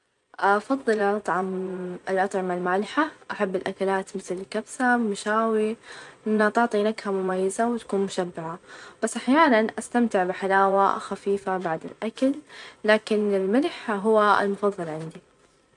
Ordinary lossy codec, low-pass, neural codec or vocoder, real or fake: none; 10.8 kHz; vocoder, 24 kHz, 100 mel bands, Vocos; fake